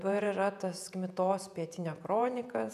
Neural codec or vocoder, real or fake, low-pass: vocoder, 48 kHz, 128 mel bands, Vocos; fake; 14.4 kHz